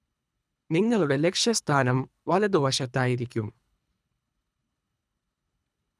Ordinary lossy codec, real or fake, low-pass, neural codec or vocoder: none; fake; none; codec, 24 kHz, 3 kbps, HILCodec